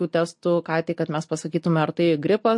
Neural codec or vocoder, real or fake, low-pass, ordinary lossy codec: none; real; 10.8 kHz; MP3, 48 kbps